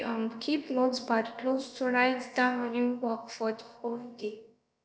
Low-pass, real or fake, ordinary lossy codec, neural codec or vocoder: none; fake; none; codec, 16 kHz, about 1 kbps, DyCAST, with the encoder's durations